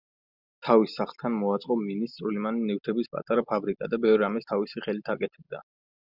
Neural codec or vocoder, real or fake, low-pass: none; real; 5.4 kHz